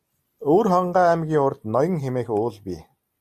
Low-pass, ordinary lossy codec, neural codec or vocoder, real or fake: 14.4 kHz; MP3, 64 kbps; none; real